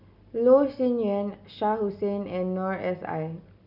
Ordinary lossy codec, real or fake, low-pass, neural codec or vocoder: none; real; 5.4 kHz; none